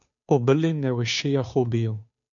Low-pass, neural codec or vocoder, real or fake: 7.2 kHz; codec, 16 kHz, 2 kbps, FunCodec, trained on Chinese and English, 25 frames a second; fake